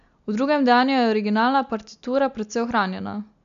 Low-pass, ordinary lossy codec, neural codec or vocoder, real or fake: 7.2 kHz; MP3, 64 kbps; none; real